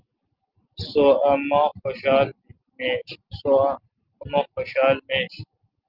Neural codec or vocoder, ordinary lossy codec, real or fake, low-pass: none; Opus, 32 kbps; real; 5.4 kHz